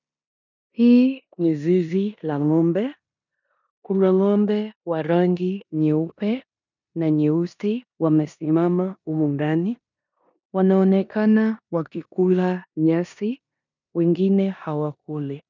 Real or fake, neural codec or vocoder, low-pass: fake; codec, 16 kHz in and 24 kHz out, 0.9 kbps, LongCat-Audio-Codec, four codebook decoder; 7.2 kHz